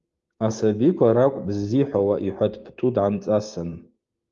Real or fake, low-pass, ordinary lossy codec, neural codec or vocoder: fake; 7.2 kHz; Opus, 32 kbps; codec, 16 kHz, 6 kbps, DAC